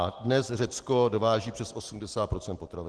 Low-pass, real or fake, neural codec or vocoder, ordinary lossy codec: 10.8 kHz; real; none; Opus, 16 kbps